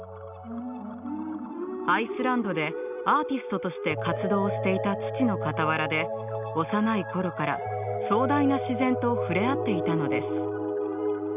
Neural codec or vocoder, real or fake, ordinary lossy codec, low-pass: none; real; none; 3.6 kHz